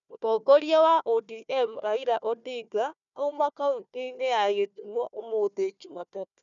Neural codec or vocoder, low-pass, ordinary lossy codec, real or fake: codec, 16 kHz, 1 kbps, FunCodec, trained on Chinese and English, 50 frames a second; 7.2 kHz; none; fake